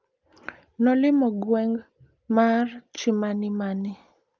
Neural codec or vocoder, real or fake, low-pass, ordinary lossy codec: none; real; 7.2 kHz; Opus, 24 kbps